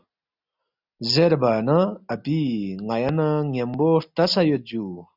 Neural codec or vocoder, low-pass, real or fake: none; 5.4 kHz; real